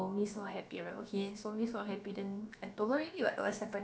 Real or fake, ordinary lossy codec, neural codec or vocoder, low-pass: fake; none; codec, 16 kHz, about 1 kbps, DyCAST, with the encoder's durations; none